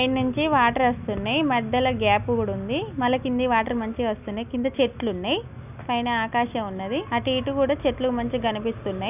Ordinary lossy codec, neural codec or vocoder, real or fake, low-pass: none; none; real; 3.6 kHz